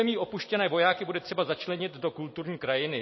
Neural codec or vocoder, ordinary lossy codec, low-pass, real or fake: none; MP3, 24 kbps; 7.2 kHz; real